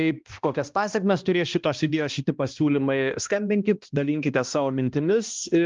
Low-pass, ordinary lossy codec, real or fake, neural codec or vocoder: 7.2 kHz; Opus, 24 kbps; fake; codec, 16 kHz, 1 kbps, X-Codec, HuBERT features, trained on balanced general audio